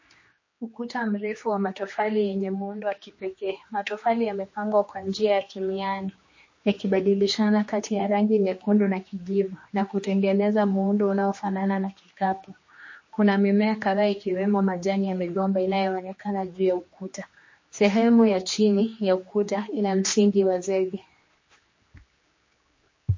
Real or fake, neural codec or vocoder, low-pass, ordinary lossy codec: fake; codec, 16 kHz, 2 kbps, X-Codec, HuBERT features, trained on general audio; 7.2 kHz; MP3, 32 kbps